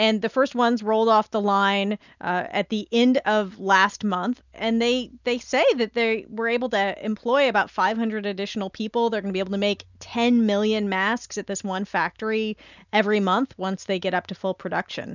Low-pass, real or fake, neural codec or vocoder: 7.2 kHz; real; none